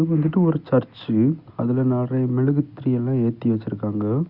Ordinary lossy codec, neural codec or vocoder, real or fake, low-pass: Opus, 64 kbps; none; real; 5.4 kHz